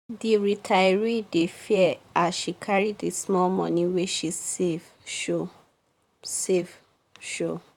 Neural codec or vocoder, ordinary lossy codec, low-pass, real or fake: vocoder, 44.1 kHz, 128 mel bands, Pupu-Vocoder; none; 19.8 kHz; fake